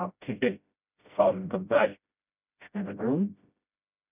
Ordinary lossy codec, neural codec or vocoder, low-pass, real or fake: none; codec, 16 kHz, 0.5 kbps, FreqCodec, smaller model; 3.6 kHz; fake